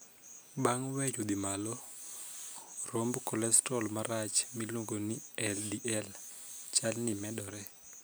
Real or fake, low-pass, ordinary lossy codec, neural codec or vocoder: real; none; none; none